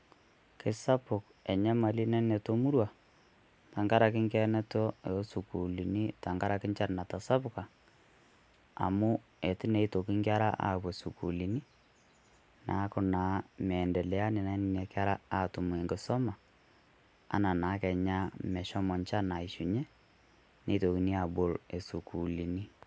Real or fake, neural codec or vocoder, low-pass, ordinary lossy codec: real; none; none; none